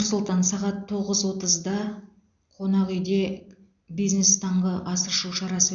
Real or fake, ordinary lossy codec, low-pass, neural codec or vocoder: real; none; 7.2 kHz; none